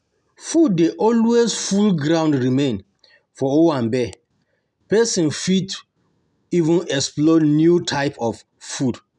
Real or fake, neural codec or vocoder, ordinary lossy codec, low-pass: real; none; none; 10.8 kHz